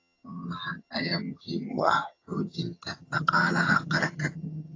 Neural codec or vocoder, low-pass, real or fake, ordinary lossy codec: vocoder, 22.05 kHz, 80 mel bands, HiFi-GAN; 7.2 kHz; fake; AAC, 32 kbps